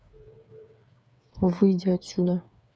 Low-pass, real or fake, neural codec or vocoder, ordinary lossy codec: none; fake; codec, 16 kHz, 8 kbps, FreqCodec, smaller model; none